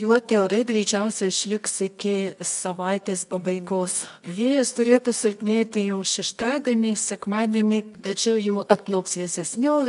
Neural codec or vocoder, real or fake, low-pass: codec, 24 kHz, 0.9 kbps, WavTokenizer, medium music audio release; fake; 10.8 kHz